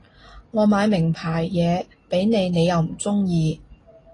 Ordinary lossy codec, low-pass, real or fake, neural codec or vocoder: AAC, 32 kbps; 10.8 kHz; real; none